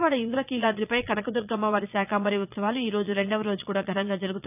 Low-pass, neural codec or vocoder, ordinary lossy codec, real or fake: 3.6 kHz; vocoder, 22.05 kHz, 80 mel bands, Vocos; none; fake